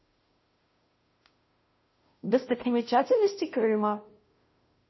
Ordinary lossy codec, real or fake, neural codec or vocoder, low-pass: MP3, 24 kbps; fake; codec, 16 kHz, 0.5 kbps, FunCodec, trained on Chinese and English, 25 frames a second; 7.2 kHz